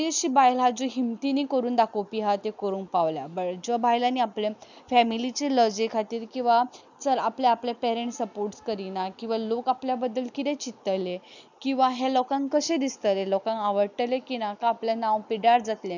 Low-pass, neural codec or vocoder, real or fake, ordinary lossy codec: 7.2 kHz; none; real; none